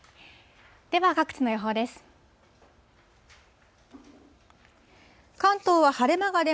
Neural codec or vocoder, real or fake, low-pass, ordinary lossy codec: none; real; none; none